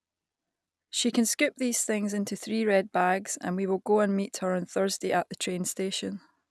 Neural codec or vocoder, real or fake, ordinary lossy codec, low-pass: none; real; none; none